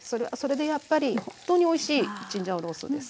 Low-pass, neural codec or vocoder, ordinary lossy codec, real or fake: none; none; none; real